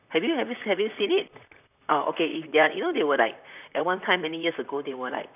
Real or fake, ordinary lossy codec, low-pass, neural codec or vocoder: fake; none; 3.6 kHz; vocoder, 44.1 kHz, 128 mel bands, Pupu-Vocoder